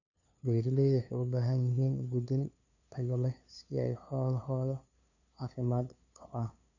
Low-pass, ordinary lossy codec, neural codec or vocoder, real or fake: 7.2 kHz; none; codec, 16 kHz, 2 kbps, FunCodec, trained on LibriTTS, 25 frames a second; fake